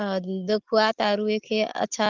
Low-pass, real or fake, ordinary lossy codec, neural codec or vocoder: 7.2 kHz; real; Opus, 16 kbps; none